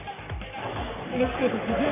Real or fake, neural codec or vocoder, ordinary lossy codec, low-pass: fake; codec, 44.1 kHz, 3.4 kbps, Pupu-Codec; none; 3.6 kHz